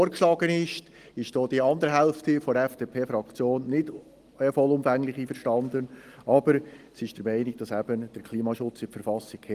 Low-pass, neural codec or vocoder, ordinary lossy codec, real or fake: 14.4 kHz; none; Opus, 24 kbps; real